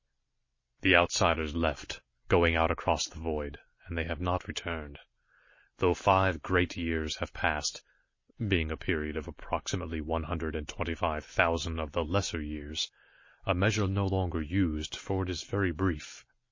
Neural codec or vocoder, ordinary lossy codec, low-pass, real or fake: none; MP3, 32 kbps; 7.2 kHz; real